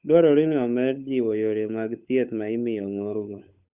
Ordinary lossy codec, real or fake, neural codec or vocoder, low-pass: Opus, 64 kbps; fake; codec, 16 kHz, 8 kbps, FunCodec, trained on Chinese and English, 25 frames a second; 3.6 kHz